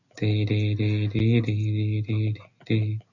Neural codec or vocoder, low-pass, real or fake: none; 7.2 kHz; real